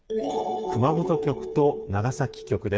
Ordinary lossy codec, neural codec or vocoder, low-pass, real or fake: none; codec, 16 kHz, 4 kbps, FreqCodec, smaller model; none; fake